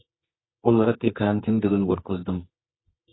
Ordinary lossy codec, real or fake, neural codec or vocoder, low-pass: AAC, 16 kbps; fake; codec, 24 kHz, 0.9 kbps, WavTokenizer, medium music audio release; 7.2 kHz